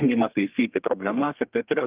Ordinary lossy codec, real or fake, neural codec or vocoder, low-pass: Opus, 24 kbps; fake; codec, 32 kHz, 1.9 kbps, SNAC; 3.6 kHz